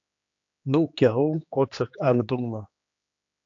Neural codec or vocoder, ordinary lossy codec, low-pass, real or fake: codec, 16 kHz, 2 kbps, X-Codec, HuBERT features, trained on general audio; AAC, 48 kbps; 7.2 kHz; fake